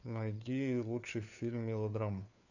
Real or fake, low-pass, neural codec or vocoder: fake; 7.2 kHz; codec, 16 kHz, 4 kbps, FunCodec, trained on LibriTTS, 50 frames a second